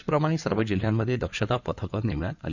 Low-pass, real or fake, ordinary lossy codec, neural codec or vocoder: 7.2 kHz; fake; none; codec, 16 kHz in and 24 kHz out, 2.2 kbps, FireRedTTS-2 codec